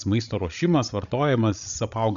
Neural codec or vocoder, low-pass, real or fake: codec, 16 kHz, 16 kbps, FreqCodec, larger model; 7.2 kHz; fake